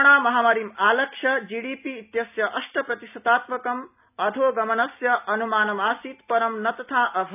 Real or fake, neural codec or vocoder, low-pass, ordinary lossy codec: real; none; 3.6 kHz; none